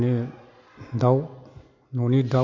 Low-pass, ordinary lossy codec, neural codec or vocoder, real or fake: 7.2 kHz; MP3, 48 kbps; none; real